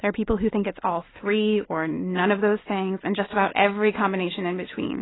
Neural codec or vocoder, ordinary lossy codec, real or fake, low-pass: none; AAC, 16 kbps; real; 7.2 kHz